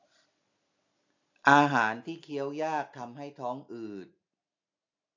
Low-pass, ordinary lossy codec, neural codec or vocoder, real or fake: 7.2 kHz; AAC, 48 kbps; none; real